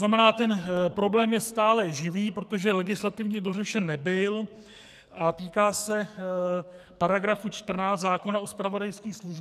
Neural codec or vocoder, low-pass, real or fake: codec, 44.1 kHz, 2.6 kbps, SNAC; 14.4 kHz; fake